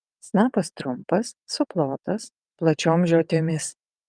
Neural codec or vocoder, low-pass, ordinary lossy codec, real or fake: vocoder, 22.05 kHz, 80 mel bands, WaveNeXt; 9.9 kHz; Opus, 32 kbps; fake